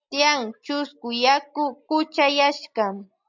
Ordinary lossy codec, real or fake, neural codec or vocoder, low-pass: MP3, 64 kbps; real; none; 7.2 kHz